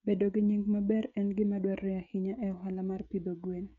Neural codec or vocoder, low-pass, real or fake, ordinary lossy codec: codec, 16 kHz, 16 kbps, FreqCodec, smaller model; 7.2 kHz; fake; none